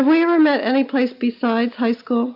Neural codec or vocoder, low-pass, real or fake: none; 5.4 kHz; real